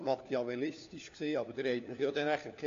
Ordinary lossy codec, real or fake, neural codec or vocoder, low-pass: AAC, 48 kbps; fake; codec, 16 kHz, 4 kbps, FunCodec, trained on LibriTTS, 50 frames a second; 7.2 kHz